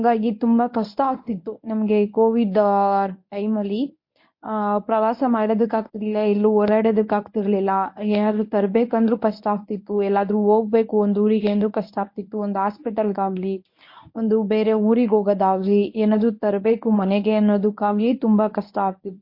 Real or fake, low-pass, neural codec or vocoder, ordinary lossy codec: fake; 5.4 kHz; codec, 24 kHz, 0.9 kbps, WavTokenizer, medium speech release version 1; MP3, 32 kbps